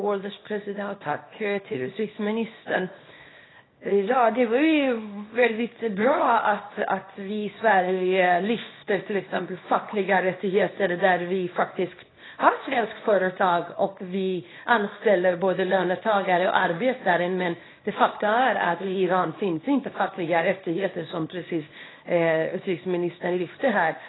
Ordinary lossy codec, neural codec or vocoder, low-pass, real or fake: AAC, 16 kbps; codec, 24 kHz, 0.9 kbps, WavTokenizer, small release; 7.2 kHz; fake